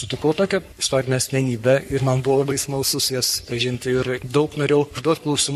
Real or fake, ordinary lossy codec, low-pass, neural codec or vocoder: fake; MP3, 64 kbps; 14.4 kHz; codec, 44.1 kHz, 3.4 kbps, Pupu-Codec